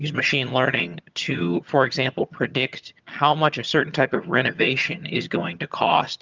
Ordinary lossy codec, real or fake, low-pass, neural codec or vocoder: Opus, 24 kbps; fake; 7.2 kHz; vocoder, 22.05 kHz, 80 mel bands, HiFi-GAN